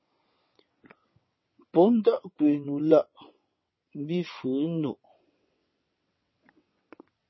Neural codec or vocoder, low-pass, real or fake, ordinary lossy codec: vocoder, 22.05 kHz, 80 mel bands, WaveNeXt; 7.2 kHz; fake; MP3, 24 kbps